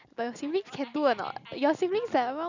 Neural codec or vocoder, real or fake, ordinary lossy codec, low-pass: none; real; none; 7.2 kHz